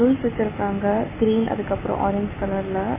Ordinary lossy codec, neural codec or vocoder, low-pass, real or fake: MP3, 16 kbps; none; 3.6 kHz; real